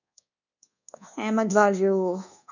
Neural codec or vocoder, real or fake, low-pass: codec, 24 kHz, 1.2 kbps, DualCodec; fake; 7.2 kHz